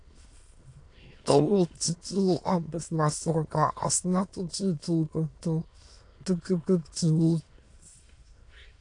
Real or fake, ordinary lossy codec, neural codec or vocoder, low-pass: fake; AAC, 48 kbps; autoencoder, 22.05 kHz, a latent of 192 numbers a frame, VITS, trained on many speakers; 9.9 kHz